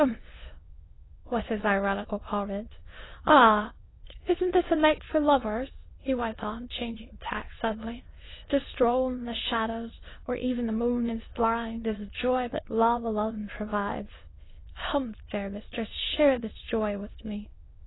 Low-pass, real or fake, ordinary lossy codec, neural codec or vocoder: 7.2 kHz; fake; AAC, 16 kbps; autoencoder, 22.05 kHz, a latent of 192 numbers a frame, VITS, trained on many speakers